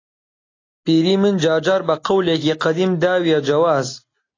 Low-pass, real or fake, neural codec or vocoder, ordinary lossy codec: 7.2 kHz; real; none; AAC, 32 kbps